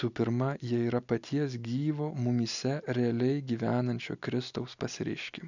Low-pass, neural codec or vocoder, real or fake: 7.2 kHz; none; real